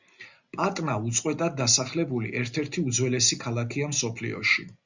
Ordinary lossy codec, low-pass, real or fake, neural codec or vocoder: Opus, 64 kbps; 7.2 kHz; real; none